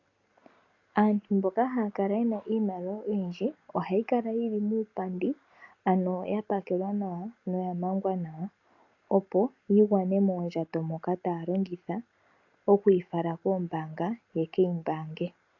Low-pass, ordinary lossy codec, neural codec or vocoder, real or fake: 7.2 kHz; AAC, 48 kbps; none; real